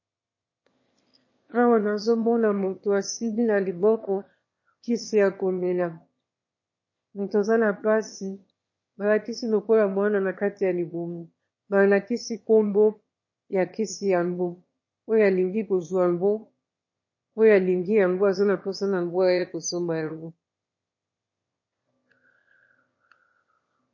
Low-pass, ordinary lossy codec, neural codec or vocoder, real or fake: 7.2 kHz; MP3, 32 kbps; autoencoder, 22.05 kHz, a latent of 192 numbers a frame, VITS, trained on one speaker; fake